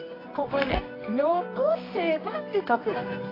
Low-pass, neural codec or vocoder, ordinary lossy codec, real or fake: 5.4 kHz; codec, 24 kHz, 0.9 kbps, WavTokenizer, medium music audio release; AAC, 24 kbps; fake